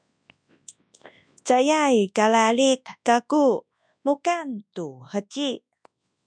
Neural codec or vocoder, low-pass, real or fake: codec, 24 kHz, 0.9 kbps, WavTokenizer, large speech release; 9.9 kHz; fake